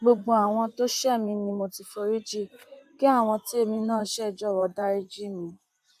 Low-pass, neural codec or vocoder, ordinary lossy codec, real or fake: 14.4 kHz; vocoder, 44.1 kHz, 128 mel bands, Pupu-Vocoder; AAC, 96 kbps; fake